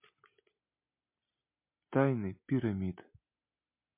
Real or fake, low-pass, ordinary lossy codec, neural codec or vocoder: real; 3.6 kHz; MP3, 24 kbps; none